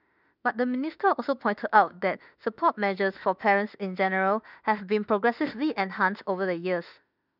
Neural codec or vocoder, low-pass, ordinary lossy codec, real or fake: autoencoder, 48 kHz, 32 numbers a frame, DAC-VAE, trained on Japanese speech; 5.4 kHz; none; fake